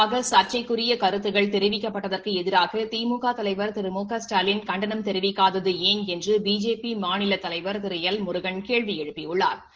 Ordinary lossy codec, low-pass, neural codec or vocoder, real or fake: Opus, 16 kbps; 7.2 kHz; none; real